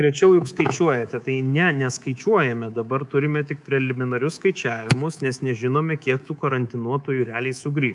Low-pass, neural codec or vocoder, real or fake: 10.8 kHz; codec, 24 kHz, 3.1 kbps, DualCodec; fake